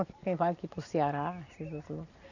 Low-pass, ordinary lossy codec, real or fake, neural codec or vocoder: 7.2 kHz; none; real; none